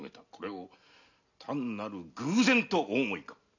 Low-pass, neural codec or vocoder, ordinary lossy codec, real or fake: 7.2 kHz; none; none; real